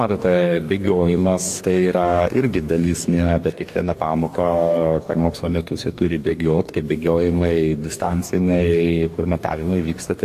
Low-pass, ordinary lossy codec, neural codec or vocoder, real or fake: 14.4 kHz; AAC, 64 kbps; codec, 44.1 kHz, 2.6 kbps, DAC; fake